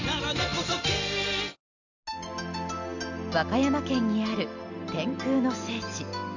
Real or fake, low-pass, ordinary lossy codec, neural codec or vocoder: real; 7.2 kHz; none; none